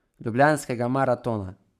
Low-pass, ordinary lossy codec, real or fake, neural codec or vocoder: 14.4 kHz; none; fake; codec, 44.1 kHz, 7.8 kbps, Pupu-Codec